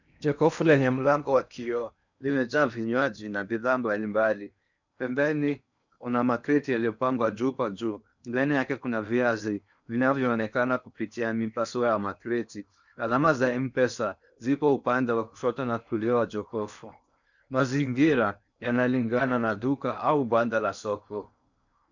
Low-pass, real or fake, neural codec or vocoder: 7.2 kHz; fake; codec, 16 kHz in and 24 kHz out, 0.8 kbps, FocalCodec, streaming, 65536 codes